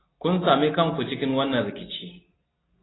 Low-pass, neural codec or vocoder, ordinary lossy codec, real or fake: 7.2 kHz; none; AAC, 16 kbps; real